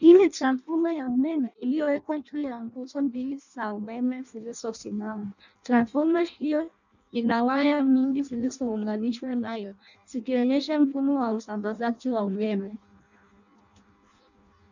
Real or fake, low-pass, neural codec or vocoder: fake; 7.2 kHz; codec, 16 kHz in and 24 kHz out, 0.6 kbps, FireRedTTS-2 codec